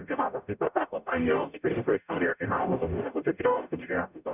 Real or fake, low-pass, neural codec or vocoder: fake; 3.6 kHz; codec, 44.1 kHz, 0.9 kbps, DAC